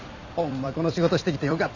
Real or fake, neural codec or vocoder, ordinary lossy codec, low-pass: fake; vocoder, 44.1 kHz, 128 mel bands every 256 samples, BigVGAN v2; none; 7.2 kHz